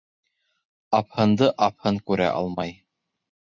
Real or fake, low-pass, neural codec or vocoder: real; 7.2 kHz; none